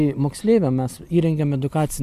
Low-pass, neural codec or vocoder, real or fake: 14.4 kHz; none; real